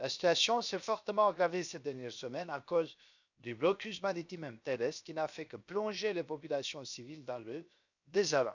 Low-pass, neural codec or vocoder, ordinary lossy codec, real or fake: 7.2 kHz; codec, 16 kHz, 0.7 kbps, FocalCodec; none; fake